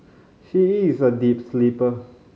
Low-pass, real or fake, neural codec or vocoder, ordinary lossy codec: none; real; none; none